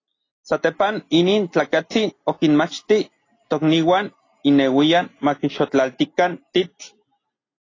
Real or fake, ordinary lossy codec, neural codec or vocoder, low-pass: real; AAC, 32 kbps; none; 7.2 kHz